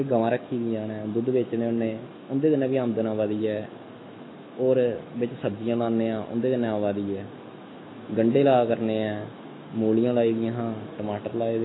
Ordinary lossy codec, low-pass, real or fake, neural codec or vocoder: AAC, 16 kbps; 7.2 kHz; fake; autoencoder, 48 kHz, 128 numbers a frame, DAC-VAE, trained on Japanese speech